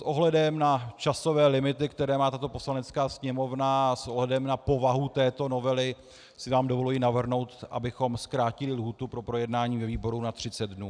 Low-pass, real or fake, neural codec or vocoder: 9.9 kHz; real; none